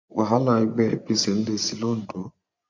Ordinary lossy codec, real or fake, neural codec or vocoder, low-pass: MP3, 48 kbps; fake; vocoder, 24 kHz, 100 mel bands, Vocos; 7.2 kHz